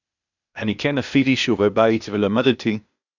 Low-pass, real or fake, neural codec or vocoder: 7.2 kHz; fake; codec, 16 kHz, 0.8 kbps, ZipCodec